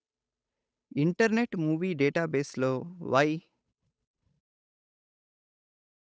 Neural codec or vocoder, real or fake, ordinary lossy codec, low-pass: codec, 16 kHz, 8 kbps, FunCodec, trained on Chinese and English, 25 frames a second; fake; none; none